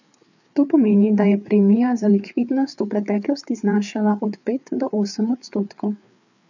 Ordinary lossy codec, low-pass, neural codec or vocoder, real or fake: none; 7.2 kHz; codec, 16 kHz, 4 kbps, FreqCodec, larger model; fake